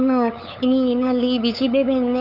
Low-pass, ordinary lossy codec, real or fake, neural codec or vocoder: 5.4 kHz; none; fake; codec, 16 kHz, 8 kbps, FunCodec, trained on LibriTTS, 25 frames a second